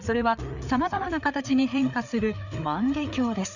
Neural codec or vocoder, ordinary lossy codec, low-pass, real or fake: codec, 16 kHz, 4 kbps, FreqCodec, larger model; none; 7.2 kHz; fake